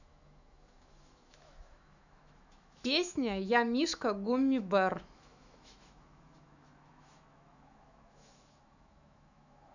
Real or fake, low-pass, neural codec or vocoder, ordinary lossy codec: fake; 7.2 kHz; autoencoder, 48 kHz, 128 numbers a frame, DAC-VAE, trained on Japanese speech; none